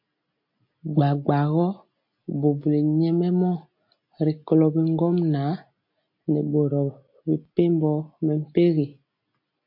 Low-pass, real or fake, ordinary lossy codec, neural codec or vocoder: 5.4 kHz; real; MP3, 32 kbps; none